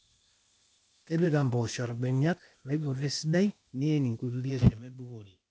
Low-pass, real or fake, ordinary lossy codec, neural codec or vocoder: none; fake; none; codec, 16 kHz, 0.8 kbps, ZipCodec